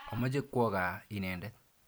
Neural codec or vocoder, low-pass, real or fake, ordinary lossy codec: vocoder, 44.1 kHz, 128 mel bands every 256 samples, BigVGAN v2; none; fake; none